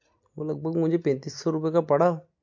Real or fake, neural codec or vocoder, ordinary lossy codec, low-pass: real; none; MP3, 48 kbps; 7.2 kHz